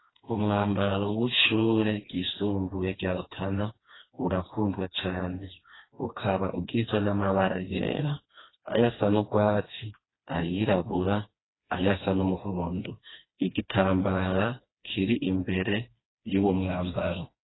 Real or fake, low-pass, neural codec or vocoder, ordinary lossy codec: fake; 7.2 kHz; codec, 16 kHz, 2 kbps, FreqCodec, smaller model; AAC, 16 kbps